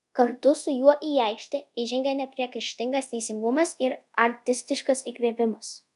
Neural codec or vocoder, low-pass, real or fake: codec, 24 kHz, 0.5 kbps, DualCodec; 10.8 kHz; fake